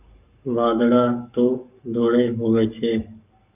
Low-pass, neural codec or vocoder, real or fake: 3.6 kHz; codec, 44.1 kHz, 7.8 kbps, Pupu-Codec; fake